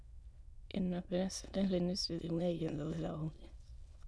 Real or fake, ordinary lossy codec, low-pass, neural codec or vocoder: fake; none; none; autoencoder, 22.05 kHz, a latent of 192 numbers a frame, VITS, trained on many speakers